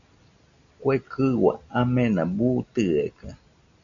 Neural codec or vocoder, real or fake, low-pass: none; real; 7.2 kHz